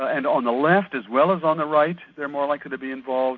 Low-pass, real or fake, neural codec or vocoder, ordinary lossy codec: 7.2 kHz; real; none; MP3, 48 kbps